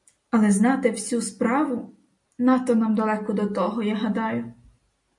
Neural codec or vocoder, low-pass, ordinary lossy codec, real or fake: none; 10.8 kHz; MP3, 48 kbps; real